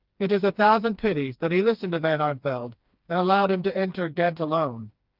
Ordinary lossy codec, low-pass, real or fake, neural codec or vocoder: Opus, 24 kbps; 5.4 kHz; fake; codec, 16 kHz, 2 kbps, FreqCodec, smaller model